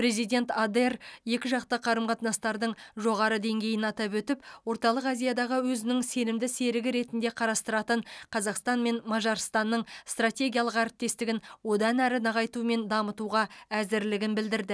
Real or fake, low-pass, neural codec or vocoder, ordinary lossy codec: real; none; none; none